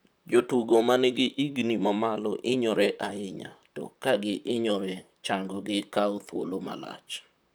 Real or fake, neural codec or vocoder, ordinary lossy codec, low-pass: fake; vocoder, 44.1 kHz, 128 mel bands, Pupu-Vocoder; none; none